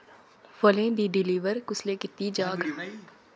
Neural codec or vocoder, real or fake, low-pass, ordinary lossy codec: none; real; none; none